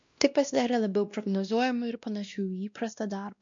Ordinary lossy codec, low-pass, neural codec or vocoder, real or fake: MP3, 64 kbps; 7.2 kHz; codec, 16 kHz, 1 kbps, X-Codec, WavLM features, trained on Multilingual LibriSpeech; fake